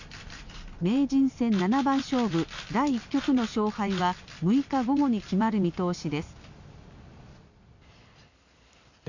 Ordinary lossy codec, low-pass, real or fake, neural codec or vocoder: none; 7.2 kHz; real; none